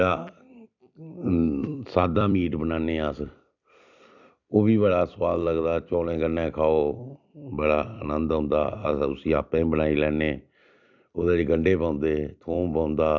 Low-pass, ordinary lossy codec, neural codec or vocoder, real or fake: 7.2 kHz; none; vocoder, 44.1 kHz, 128 mel bands every 256 samples, BigVGAN v2; fake